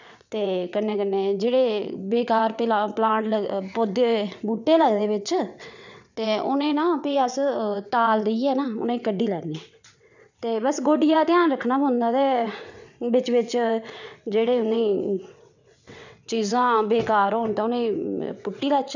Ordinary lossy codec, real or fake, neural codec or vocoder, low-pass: none; fake; vocoder, 22.05 kHz, 80 mel bands, WaveNeXt; 7.2 kHz